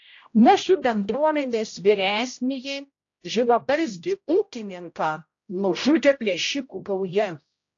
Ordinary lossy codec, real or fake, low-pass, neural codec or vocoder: AAC, 48 kbps; fake; 7.2 kHz; codec, 16 kHz, 0.5 kbps, X-Codec, HuBERT features, trained on general audio